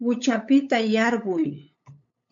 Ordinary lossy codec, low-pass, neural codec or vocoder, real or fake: MP3, 64 kbps; 7.2 kHz; codec, 16 kHz, 8 kbps, FunCodec, trained on LibriTTS, 25 frames a second; fake